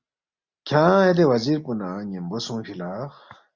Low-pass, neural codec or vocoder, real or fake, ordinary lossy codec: 7.2 kHz; none; real; Opus, 64 kbps